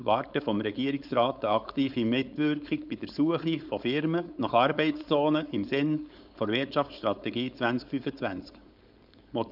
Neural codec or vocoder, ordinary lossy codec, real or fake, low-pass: codec, 16 kHz, 4.8 kbps, FACodec; none; fake; 5.4 kHz